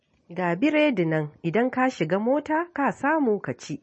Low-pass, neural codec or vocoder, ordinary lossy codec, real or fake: 10.8 kHz; none; MP3, 32 kbps; real